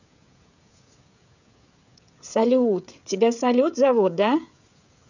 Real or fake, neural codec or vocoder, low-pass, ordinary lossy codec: fake; codec, 16 kHz, 16 kbps, FreqCodec, smaller model; 7.2 kHz; none